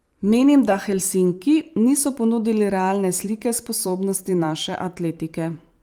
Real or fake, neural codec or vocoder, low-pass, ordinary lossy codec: fake; vocoder, 44.1 kHz, 128 mel bands every 512 samples, BigVGAN v2; 19.8 kHz; Opus, 32 kbps